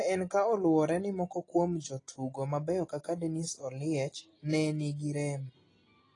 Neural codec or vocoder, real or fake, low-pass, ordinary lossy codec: none; real; 10.8 kHz; AAC, 32 kbps